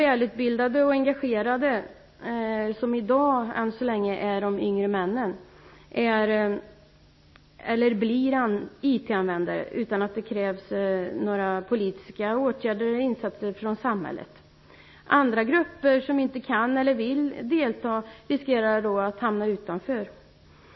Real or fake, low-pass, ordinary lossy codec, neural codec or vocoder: real; 7.2 kHz; MP3, 24 kbps; none